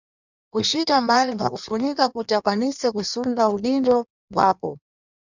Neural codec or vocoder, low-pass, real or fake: codec, 16 kHz in and 24 kHz out, 1.1 kbps, FireRedTTS-2 codec; 7.2 kHz; fake